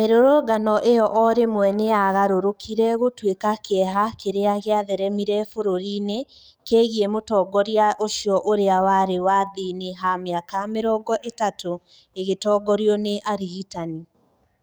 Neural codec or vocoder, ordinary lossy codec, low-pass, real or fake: codec, 44.1 kHz, 7.8 kbps, DAC; none; none; fake